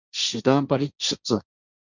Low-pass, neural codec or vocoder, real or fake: 7.2 kHz; codec, 16 kHz, 1.1 kbps, Voila-Tokenizer; fake